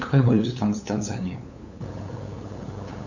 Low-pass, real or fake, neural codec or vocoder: 7.2 kHz; fake; codec, 16 kHz, 8 kbps, FunCodec, trained on LibriTTS, 25 frames a second